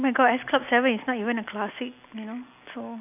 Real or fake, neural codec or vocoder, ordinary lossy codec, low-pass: real; none; AAC, 32 kbps; 3.6 kHz